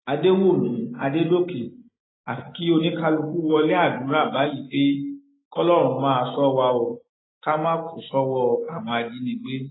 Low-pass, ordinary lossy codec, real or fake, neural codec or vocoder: 7.2 kHz; AAC, 16 kbps; real; none